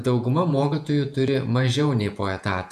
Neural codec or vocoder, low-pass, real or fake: vocoder, 48 kHz, 128 mel bands, Vocos; 14.4 kHz; fake